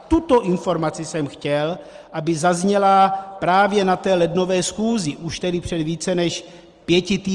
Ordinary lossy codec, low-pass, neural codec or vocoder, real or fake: Opus, 24 kbps; 10.8 kHz; none; real